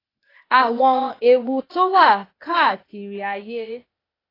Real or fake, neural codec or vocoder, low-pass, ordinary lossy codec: fake; codec, 16 kHz, 0.8 kbps, ZipCodec; 5.4 kHz; AAC, 24 kbps